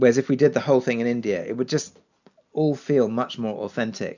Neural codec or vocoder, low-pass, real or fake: none; 7.2 kHz; real